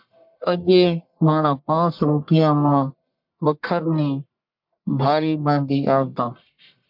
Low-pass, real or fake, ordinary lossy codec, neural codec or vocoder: 5.4 kHz; fake; MP3, 48 kbps; codec, 44.1 kHz, 1.7 kbps, Pupu-Codec